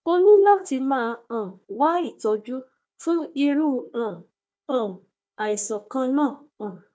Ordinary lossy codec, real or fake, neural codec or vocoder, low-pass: none; fake; codec, 16 kHz, 1 kbps, FunCodec, trained on Chinese and English, 50 frames a second; none